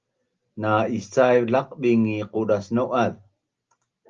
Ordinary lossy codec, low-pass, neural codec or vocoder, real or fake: Opus, 32 kbps; 7.2 kHz; none; real